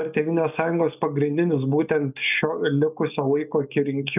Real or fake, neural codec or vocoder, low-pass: real; none; 3.6 kHz